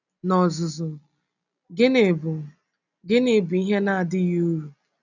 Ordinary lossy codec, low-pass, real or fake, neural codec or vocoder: none; 7.2 kHz; real; none